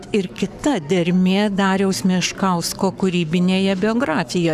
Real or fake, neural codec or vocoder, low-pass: fake; codec, 44.1 kHz, 7.8 kbps, Pupu-Codec; 14.4 kHz